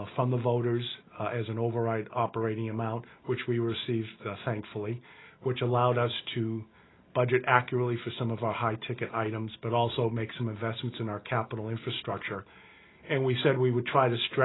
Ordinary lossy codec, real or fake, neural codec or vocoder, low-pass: AAC, 16 kbps; real; none; 7.2 kHz